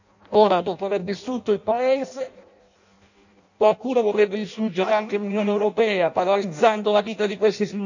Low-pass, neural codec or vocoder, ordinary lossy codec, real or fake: 7.2 kHz; codec, 16 kHz in and 24 kHz out, 0.6 kbps, FireRedTTS-2 codec; none; fake